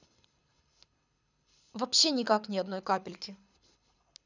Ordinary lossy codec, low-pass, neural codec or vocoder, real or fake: none; 7.2 kHz; codec, 24 kHz, 6 kbps, HILCodec; fake